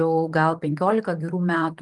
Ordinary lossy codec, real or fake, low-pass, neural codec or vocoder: Opus, 32 kbps; fake; 10.8 kHz; vocoder, 44.1 kHz, 128 mel bands, Pupu-Vocoder